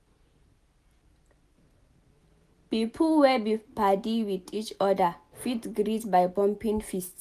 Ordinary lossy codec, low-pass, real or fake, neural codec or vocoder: none; 14.4 kHz; real; none